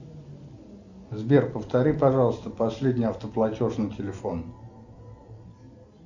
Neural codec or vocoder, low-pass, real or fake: none; 7.2 kHz; real